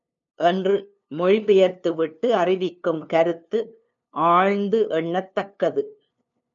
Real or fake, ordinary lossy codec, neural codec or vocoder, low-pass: fake; MP3, 96 kbps; codec, 16 kHz, 2 kbps, FunCodec, trained on LibriTTS, 25 frames a second; 7.2 kHz